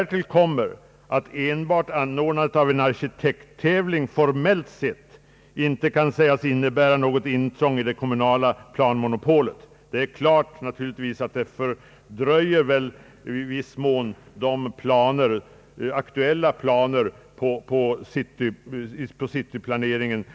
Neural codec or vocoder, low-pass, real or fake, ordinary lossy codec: none; none; real; none